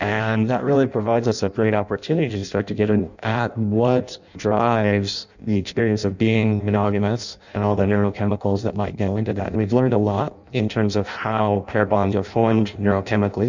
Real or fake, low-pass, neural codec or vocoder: fake; 7.2 kHz; codec, 16 kHz in and 24 kHz out, 0.6 kbps, FireRedTTS-2 codec